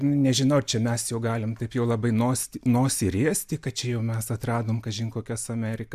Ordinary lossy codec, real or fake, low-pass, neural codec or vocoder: MP3, 96 kbps; real; 14.4 kHz; none